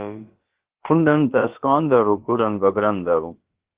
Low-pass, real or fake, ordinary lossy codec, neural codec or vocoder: 3.6 kHz; fake; Opus, 16 kbps; codec, 16 kHz, about 1 kbps, DyCAST, with the encoder's durations